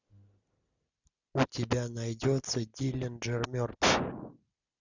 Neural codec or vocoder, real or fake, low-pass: none; real; 7.2 kHz